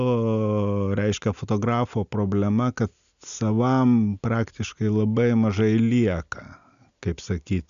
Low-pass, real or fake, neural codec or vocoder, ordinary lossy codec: 7.2 kHz; real; none; MP3, 96 kbps